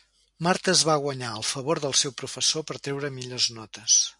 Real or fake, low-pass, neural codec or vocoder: real; 10.8 kHz; none